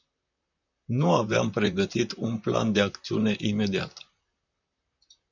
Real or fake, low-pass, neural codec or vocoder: fake; 7.2 kHz; vocoder, 44.1 kHz, 128 mel bands, Pupu-Vocoder